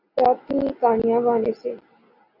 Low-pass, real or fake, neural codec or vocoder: 5.4 kHz; real; none